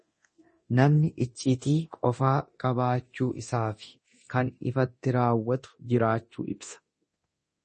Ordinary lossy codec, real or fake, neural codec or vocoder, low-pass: MP3, 32 kbps; fake; codec, 24 kHz, 0.9 kbps, DualCodec; 10.8 kHz